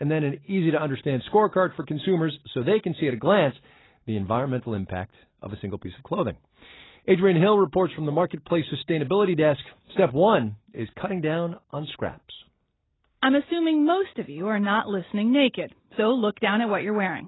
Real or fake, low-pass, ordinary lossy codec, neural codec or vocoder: real; 7.2 kHz; AAC, 16 kbps; none